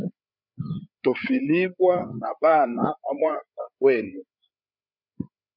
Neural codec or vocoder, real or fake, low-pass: codec, 16 kHz, 8 kbps, FreqCodec, larger model; fake; 5.4 kHz